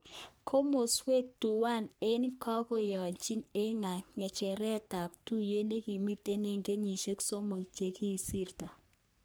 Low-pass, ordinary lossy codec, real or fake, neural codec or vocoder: none; none; fake; codec, 44.1 kHz, 3.4 kbps, Pupu-Codec